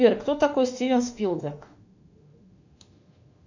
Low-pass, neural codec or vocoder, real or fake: 7.2 kHz; autoencoder, 48 kHz, 32 numbers a frame, DAC-VAE, trained on Japanese speech; fake